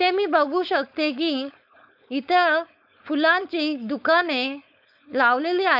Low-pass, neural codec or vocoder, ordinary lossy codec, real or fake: 5.4 kHz; codec, 16 kHz, 4.8 kbps, FACodec; none; fake